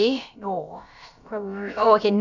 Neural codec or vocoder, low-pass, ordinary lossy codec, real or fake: codec, 16 kHz, about 1 kbps, DyCAST, with the encoder's durations; 7.2 kHz; none; fake